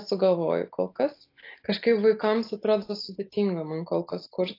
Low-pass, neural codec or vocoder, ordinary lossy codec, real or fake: 5.4 kHz; none; AAC, 32 kbps; real